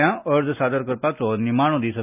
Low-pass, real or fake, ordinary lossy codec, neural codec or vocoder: 3.6 kHz; real; none; none